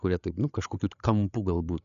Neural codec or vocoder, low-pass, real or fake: codec, 16 kHz, 8 kbps, FreqCodec, larger model; 7.2 kHz; fake